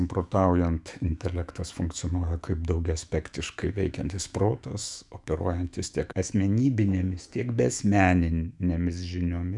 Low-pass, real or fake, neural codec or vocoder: 10.8 kHz; fake; autoencoder, 48 kHz, 128 numbers a frame, DAC-VAE, trained on Japanese speech